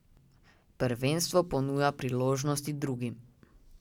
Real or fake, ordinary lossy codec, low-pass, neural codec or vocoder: real; none; 19.8 kHz; none